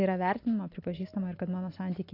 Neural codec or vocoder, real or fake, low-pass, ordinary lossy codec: none; real; 5.4 kHz; AAC, 32 kbps